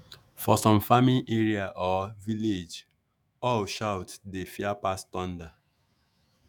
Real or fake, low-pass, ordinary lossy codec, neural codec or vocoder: fake; none; none; autoencoder, 48 kHz, 128 numbers a frame, DAC-VAE, trained on Japanese speech